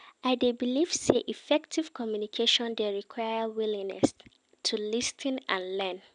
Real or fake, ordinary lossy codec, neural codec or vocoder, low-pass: real; none; none; 9.9 kHz